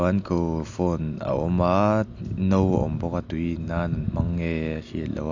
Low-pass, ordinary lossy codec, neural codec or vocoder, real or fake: 7.2 kHz; AAC, 48 kbps; none; real